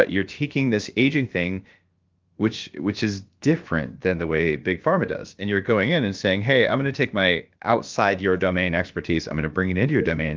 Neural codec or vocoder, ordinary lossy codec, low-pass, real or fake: codec, 16 kHz, about 1 kbps, DyCAST, with the encoder's durations; Opus, 32 kbps; 7.2 kHz; fake